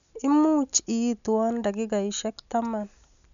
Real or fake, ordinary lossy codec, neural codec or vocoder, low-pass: real; none; none; 7.2 kHz